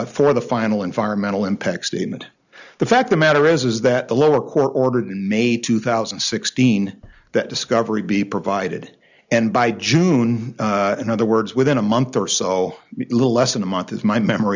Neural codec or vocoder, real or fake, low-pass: none; real; 7.2 kHz